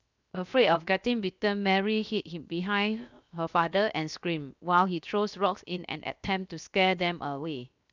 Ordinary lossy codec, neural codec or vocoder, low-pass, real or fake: none; codec, 16 kHz, 0.7 kbps, FocalCodec; 7.2 kHz; fake